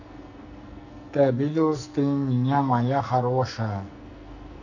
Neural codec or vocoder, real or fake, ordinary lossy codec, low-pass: codec, 44.1 kHz, 2.6 kbps, SNAC; fake; none; 7.2 kHz